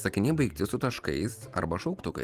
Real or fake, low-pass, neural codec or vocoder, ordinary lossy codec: fake; 14.4 kHz; vocoder, 44.1 kHz, 128 mel bands every 512 samples, BigVGAN v2; Opus, 24 kbps